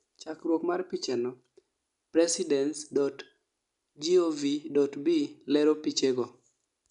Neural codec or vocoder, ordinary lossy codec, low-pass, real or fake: none; none; 10.8 kHz; real